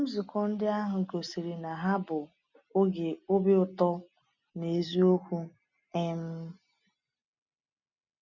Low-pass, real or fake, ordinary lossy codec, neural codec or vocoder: 7.2 kHz; real; none; none